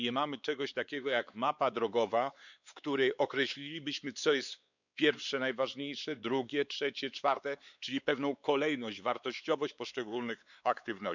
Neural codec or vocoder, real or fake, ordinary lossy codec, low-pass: codec, 16 kHz, 4 kbps, X-Codec, WavLM features, trained on Multilingual LibriSpeech; fake; none; 7.2 kHz